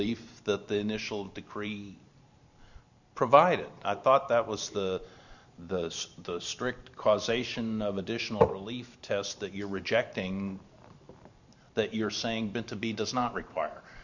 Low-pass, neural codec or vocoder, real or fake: 7.2 kHz; vocoder, 44.1 kHz, 128 mel bands every 512 samples, BigVGAN v2; fake